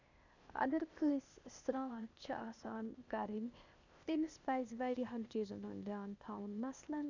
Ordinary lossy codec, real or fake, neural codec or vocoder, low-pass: none; fake; codec, 16 kHz, 0.8 kbps, ZipCodec; 7.2 kHz